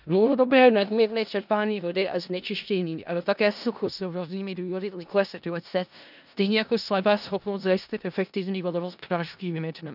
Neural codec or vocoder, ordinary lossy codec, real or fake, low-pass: codec, 16 kHz in and 24 kHz out, 0.4 kbps, LongCat-Audio-Codec, four codebook decoder; none; fake; 5.4 kHz